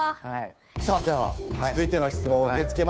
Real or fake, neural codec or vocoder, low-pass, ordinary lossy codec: fake; codec, 16 kHz, 2 kbps, FunCodec, trained on Chinese and English, 25 frames a second; none; none